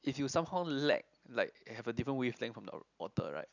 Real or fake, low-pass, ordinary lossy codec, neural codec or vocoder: real; 7.2 kHz; none; none